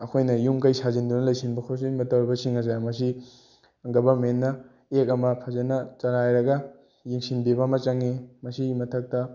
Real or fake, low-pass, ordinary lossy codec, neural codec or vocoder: real; 7.2 kHz; none; none